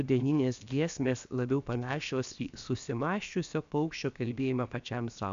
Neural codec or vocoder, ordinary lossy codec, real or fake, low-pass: codec, 16 kHz, 0.7 kbps, FocalCodec; MP3, 64 kbps; fake; 7.2 kHz